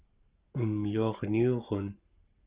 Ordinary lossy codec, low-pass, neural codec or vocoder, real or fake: Opus, 32 kbps; 3.6 kHz; codec, 44.1 kHz, 7.8 kbps, Pupu-Codec; fake